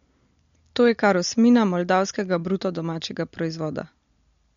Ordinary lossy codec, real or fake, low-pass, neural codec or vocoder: MP3, 48 kbps; real; 7.2 kHz; none